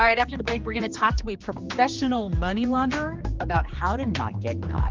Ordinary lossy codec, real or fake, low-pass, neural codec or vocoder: Opus, 32 kbps; fake; 7.2 kHz; codec, 16 kHz, 2 kbps, X-Codec, HuBERT features, trained on general audio